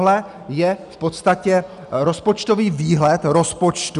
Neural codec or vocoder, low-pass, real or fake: none; 10.8 kHz; real